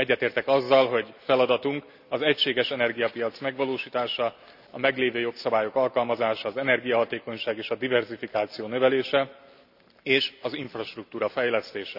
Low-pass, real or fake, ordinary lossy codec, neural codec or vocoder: 5.4 kHz; real; none; none